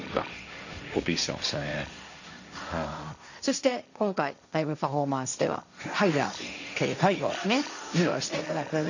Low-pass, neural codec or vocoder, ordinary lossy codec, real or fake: 7.2 kHz; codec, 16 kHz, 1.1 kbps, Voila-Tokenizer; none; fake